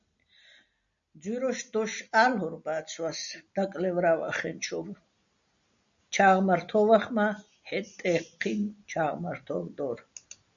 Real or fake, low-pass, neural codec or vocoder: real; 7.2 kHz; none